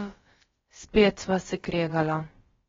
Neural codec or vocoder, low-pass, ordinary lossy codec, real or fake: codec, 16 kHz, about 1 kbps, DyCAST, with the encoder's durations; 7.2 kHz; AAC, 24 kbps; fake